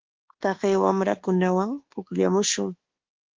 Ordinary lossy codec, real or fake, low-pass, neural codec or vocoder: Opus, 16 kbps; fake; 7.2 kHz; codec, 24 kHz, 1.2 kbps, DualCodec